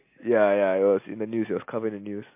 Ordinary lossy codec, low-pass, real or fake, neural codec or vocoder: MP3, 24 kbps; 3.6 kHz; real; none